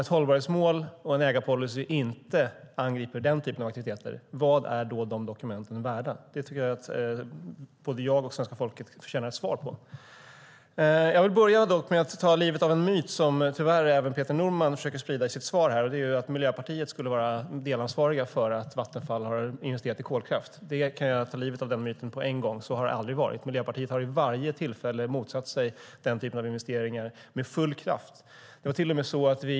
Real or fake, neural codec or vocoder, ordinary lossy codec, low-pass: real; none; none; none